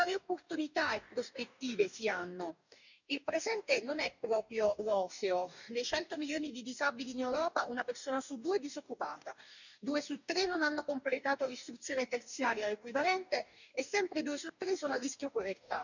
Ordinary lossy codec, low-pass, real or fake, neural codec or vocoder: none; 7.2 kHz; fake; codec, 44.1 kHz, 2.6 kbps, DAC